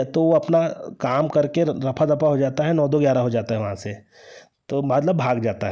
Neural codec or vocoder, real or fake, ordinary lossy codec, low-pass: none; real; none; none